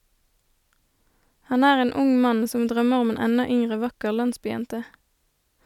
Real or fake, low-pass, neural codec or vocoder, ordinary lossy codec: real; 19.8 kHz; none; none